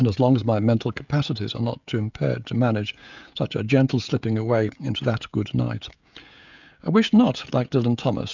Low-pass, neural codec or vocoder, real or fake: 7.2 kHz; codec, 16 kHz, 16 kbps, FreqCodec, smaller model; fake